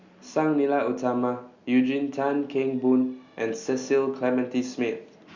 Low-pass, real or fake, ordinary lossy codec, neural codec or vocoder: 7.2 kHz; real; Opus, 64 kbps; none